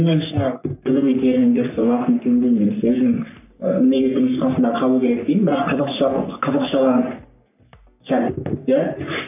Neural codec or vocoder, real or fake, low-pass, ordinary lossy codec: codec, 44.1 kHz, 3.4 kbps, Pupu-Codec; fake; 3.6 kHz; none